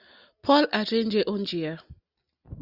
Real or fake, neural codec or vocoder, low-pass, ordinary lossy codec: real; none; 5.4 kHz; Opus, 64 kbps